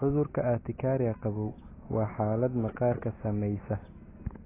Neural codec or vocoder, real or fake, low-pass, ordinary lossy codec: none; real; 3.6 kHz; AAC, 16 kbps